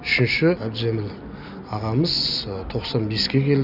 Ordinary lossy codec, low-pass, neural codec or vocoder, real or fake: none; 5.4 kHz; none; real